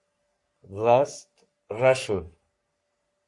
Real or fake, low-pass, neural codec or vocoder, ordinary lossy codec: fake; 10.8 kHz; codec, 44.1 kHz, 3.4 kbps, Pupu-Codec; Opus, 64 kbps